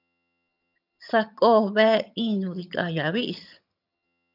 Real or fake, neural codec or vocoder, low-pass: fake; vocoder, 22.05 kHz, 80 mel bands, HiFi-GAN; 5.4 kHz